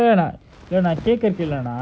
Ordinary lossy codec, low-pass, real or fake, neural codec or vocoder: none; none; real; none